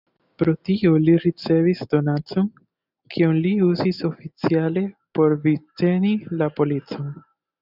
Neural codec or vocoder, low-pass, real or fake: none; 5.4 kHz; real